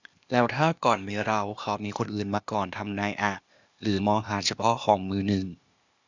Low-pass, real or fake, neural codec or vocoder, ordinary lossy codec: 7.2 kHz; fake; codec, 16 kHz, 0.8 kbps, ZipCodec; Opus, 64 kbps